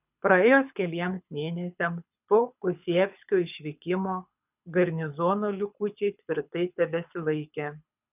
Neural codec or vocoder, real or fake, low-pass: codec, 24 kHz, 6 kbps, HILCodec; fake; 3.6 kHz